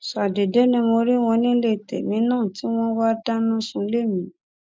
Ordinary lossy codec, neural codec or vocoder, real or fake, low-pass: none; none; real; none